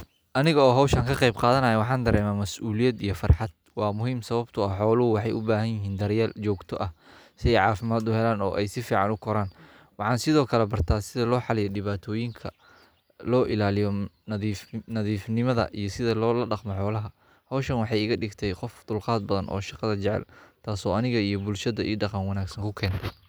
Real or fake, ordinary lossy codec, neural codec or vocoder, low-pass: real; none; none; none